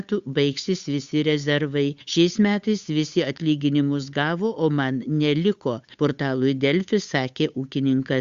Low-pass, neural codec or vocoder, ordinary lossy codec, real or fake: 7.2 kHz; codec, 16 kHz, 8 kbps, FunCodec, trained on Chinese and English, 25 frames a second; Opus, 64 kbps; fake